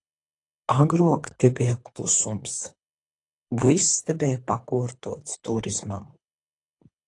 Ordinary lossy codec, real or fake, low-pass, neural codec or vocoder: AAC, 48 kbps; fake; 10.8 kHz; codec, 24 kHz, 3 kbps, HILCodec